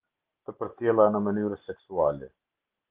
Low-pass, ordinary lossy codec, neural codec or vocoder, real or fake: 3.6 kHz; Opus, 16 kbps; none; real